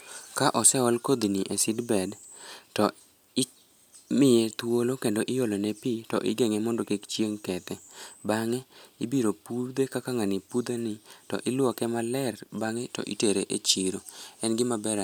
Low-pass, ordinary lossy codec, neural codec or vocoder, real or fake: none; none; none; real